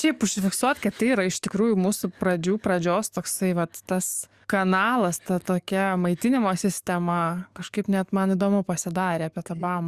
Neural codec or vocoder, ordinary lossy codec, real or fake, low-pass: codec, 44.1 kHz, 7.8 kbps, DAC; Opus, 64 kbps; fake; 14.4 kHz